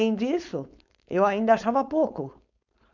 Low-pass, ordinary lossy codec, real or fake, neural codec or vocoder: 7.2 kHz; none; fake; codec, 16 kHz, 4.8 kbps, FACodec